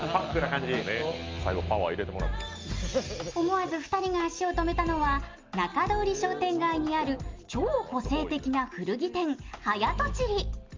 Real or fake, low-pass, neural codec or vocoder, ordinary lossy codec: real; 7.2 kHz; none; Opus, 24 kbps